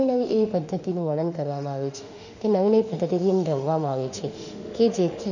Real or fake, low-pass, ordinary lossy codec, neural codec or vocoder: fake; 7.2 kHz; none; autoencoder, 48 kHz, 32 numbers a frame, DAC-VAE, trained on Japanese speech